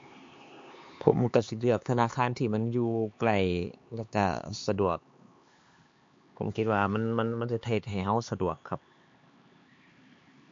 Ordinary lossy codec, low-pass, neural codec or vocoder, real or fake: MP3, 48 kbps; 7.2 kHz; codec, 16 kHz, 4 kbps, X-Codec, HuBERT features, trained on LibriSpeech; fake